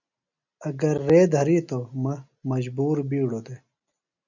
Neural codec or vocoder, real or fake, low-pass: none; real; 7.2 kHz